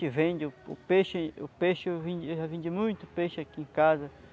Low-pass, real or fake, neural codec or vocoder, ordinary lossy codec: none; real; none; none